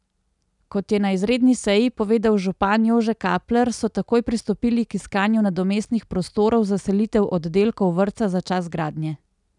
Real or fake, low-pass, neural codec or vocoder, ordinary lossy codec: real; 10.8 kHz; none; none